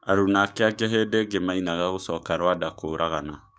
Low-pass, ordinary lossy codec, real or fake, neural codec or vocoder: none; none; fake; codec, 16 kHz, 6 kbps, DAC